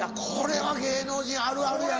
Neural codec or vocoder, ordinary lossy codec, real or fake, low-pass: none; Opus, 24 kbps; real; 7.2 kHz